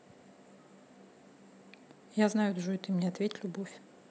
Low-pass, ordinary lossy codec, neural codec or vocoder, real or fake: none; none; none; real